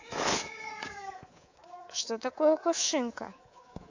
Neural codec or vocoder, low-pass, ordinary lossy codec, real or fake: codec, 24 kHz, 3.1 kbps, DualCodec; 7.2 kHz; AAC, 48 kbps; fake